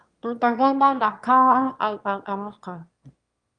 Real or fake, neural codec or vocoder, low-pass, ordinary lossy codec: fake; autoencoder, 22.05 kHz, a latent of 192 numbers a frame, VITS, trained on one speaker; 9.9 kHz; Opus, 32 kbps